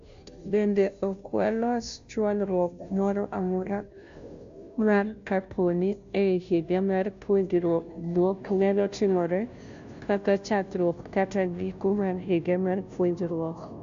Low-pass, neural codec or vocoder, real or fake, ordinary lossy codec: 7.2 kHz; codec, 16 kHz, 0.5 kbps, FunCodec, trained on Chinese and English, 25 frames a second; fake; AAC, 64 kbps